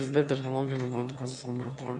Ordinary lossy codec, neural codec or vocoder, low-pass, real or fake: AAC, 64 kbps; autoencoder, 22.05 kHz, a latent of 192 numbers a frame, VITS, trained on one speaker; 9.9 kHz; fake